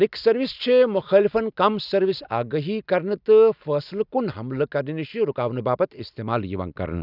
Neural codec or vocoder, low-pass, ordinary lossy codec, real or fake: none; 5.4 kHz; none; real